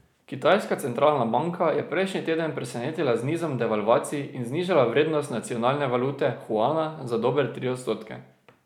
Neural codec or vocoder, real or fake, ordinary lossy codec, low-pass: none; real; none; 19.8 kHz